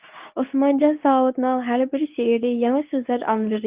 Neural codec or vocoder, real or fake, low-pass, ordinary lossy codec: codec, 24 kHz, 0.9 kbps, WavTokenizer, medium speech release version 2; fake; 3.6 kHz; Opus, 32 kbps